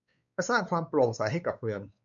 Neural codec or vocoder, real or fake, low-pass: codec, 16 kHz, 4 kbps, X-Codec, WavLM features, trained on Multilingual LibriSpeech; fake; 7.2 kHz